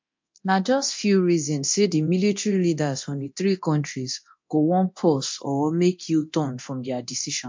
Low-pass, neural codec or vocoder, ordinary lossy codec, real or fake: 7.2 kHz; codec, 24 kHz, 0.9 kbps, DualCodec; MP3, 48 kbps; fake